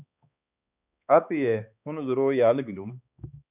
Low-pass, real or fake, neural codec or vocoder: 3.6 kHz; fake; codec, 16 kHz, 2 kbps, X-Codec, HuBERT features, trained on balanced general audio